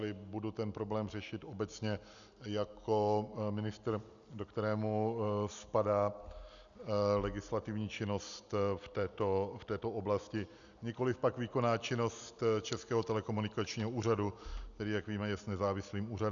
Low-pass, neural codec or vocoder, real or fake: 7.2 kHz; none; real